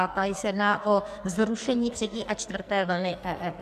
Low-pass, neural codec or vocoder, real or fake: 14.4 kHz; codec, 44.1 kHz, 2.6 kbps, SNAC; fake